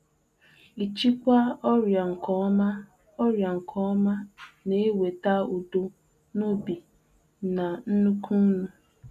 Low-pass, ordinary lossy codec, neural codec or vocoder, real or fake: 14.4 kHz; none; none; real